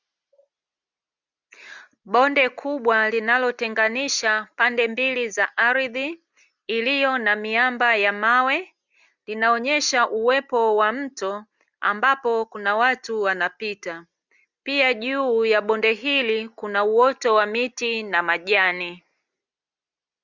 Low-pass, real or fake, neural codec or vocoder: 7.2 kHz; real; none